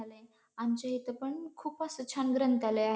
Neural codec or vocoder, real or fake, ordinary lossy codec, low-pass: none; real; none; none